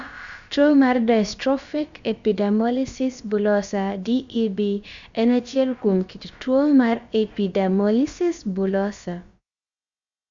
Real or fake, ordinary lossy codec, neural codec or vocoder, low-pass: fake; Opus, 64 kbps; codec, 16 kHz, about 1 kbps, DyCAST, with the encoder's durations; 7.2 kHz